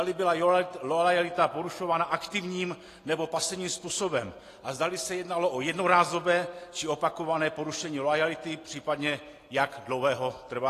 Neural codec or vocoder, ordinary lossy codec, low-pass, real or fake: none; AAC, 48 kbps; 14.4 kHz; real